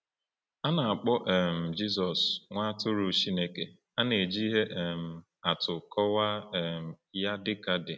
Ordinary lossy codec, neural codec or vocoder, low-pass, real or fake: none; none; none; real